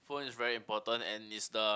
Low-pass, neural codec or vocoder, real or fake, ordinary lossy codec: none; none; real; none